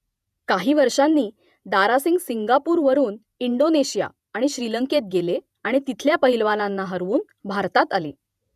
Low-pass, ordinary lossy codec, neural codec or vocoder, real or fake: 14.4 kHz; none; vocoder, 48 kHz, 128 mel bands, Vocos; fake